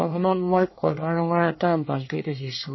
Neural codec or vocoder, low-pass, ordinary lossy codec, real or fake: codec, 24 kHz, 1 kbps, SNAC; 7.2 kHz; MP3, 24 kbps; fake